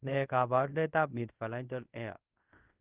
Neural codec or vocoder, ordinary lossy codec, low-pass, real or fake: codec, 24 kHz, 0.5 kbps, DualCodec; Opus, 16 kbps; 3.6 kHz; fake